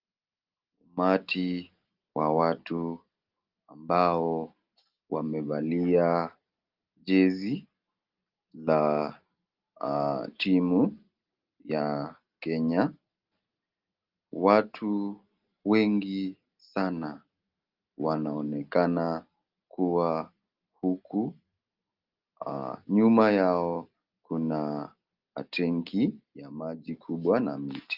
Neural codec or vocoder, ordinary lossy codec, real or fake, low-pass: none; Opus, 24 kbps; real; 5.4 kHz